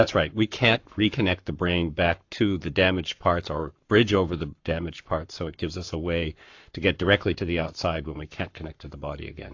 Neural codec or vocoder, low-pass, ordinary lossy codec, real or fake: codec, 44.1 kHz, 7.8 kbps, Pupu-Codec; 7.2 kHz; AAC, 48 kbps; fake